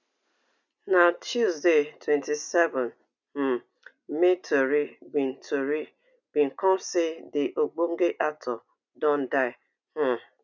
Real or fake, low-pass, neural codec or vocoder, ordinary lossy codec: real; 7.2 kHz; none; none